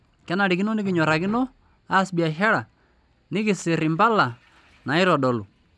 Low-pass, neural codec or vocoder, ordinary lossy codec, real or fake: none; none; none; real